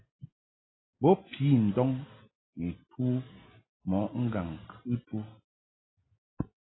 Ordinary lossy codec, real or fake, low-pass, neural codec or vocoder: AAC, 16 kbps; real; 7.2 kHz; none